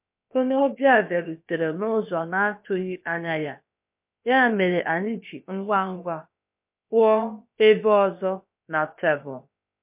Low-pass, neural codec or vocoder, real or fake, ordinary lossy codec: 3.6 kHz; codec, 16 kHz, about 1 kbps, DyCAST, with the encoder's durations; fake; MP3, 32 kbps